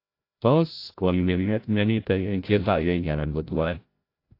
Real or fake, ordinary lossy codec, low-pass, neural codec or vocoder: fake; AAC, 32 kbps; 5.4 kHz; codec, 16 kHz, 0.5 kbps, FreqCodec, larger model